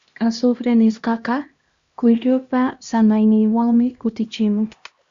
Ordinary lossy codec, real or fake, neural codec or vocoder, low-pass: Opus, 64 kbps; fake; codec, 16 kHz, 1 kbps, X-Codec, HuBERT features, trained on LibriSpeech; 7.2 kHz